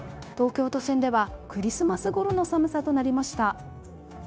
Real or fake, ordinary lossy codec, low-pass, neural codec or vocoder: fake; none; none; codec, 16 kHz, 0.9 kbps, LongCat-Audio-Codec